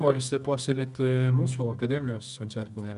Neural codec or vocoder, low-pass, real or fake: codec, 24 kHz, 0.9 kbps, WavTokenizer, medium music audio release; 10.8 kHz; fake